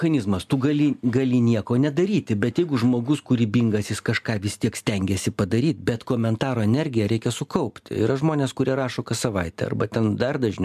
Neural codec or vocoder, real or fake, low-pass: none; real; 14.4 kHz